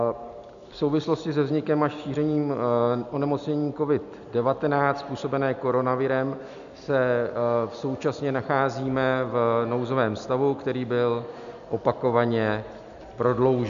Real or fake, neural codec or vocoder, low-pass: real; none; 7.2 kHz